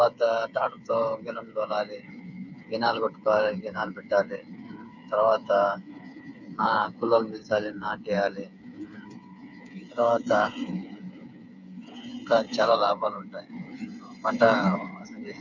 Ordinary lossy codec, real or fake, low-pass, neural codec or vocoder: none; fake; 7.2 kHz; codec, 16 kHz, 8 kbps, FreqCodec, smaller model